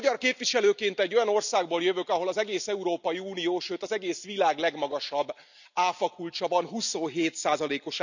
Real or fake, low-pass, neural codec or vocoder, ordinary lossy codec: real; 7.2 kHz; none; none